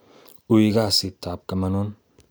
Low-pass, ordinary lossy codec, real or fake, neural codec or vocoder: none; none; real; none